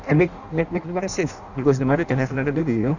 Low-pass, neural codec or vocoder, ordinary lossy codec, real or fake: 7.2 kHz; codec, 16 kHz in and 24 kHz out, 0.6 kbps, FireRedTTS-2 codec; none; fake